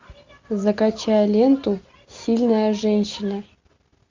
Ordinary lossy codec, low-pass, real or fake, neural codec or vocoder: MP3, 64 kbps; 7.2 kHz; real; none